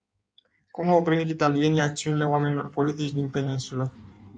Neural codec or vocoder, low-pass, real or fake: codec, 16 kHz in and 24 kHz out, 1.1 kbps, FireRedTTS-2 codec; 9.9 kHz; fake